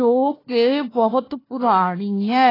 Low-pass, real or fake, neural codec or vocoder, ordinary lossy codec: 5.4 kHz; fake; codec, 16 kHz, 1 kbps, FunCodec, trained on Chinese and English, 50 frames a second; AAC, 24 kbps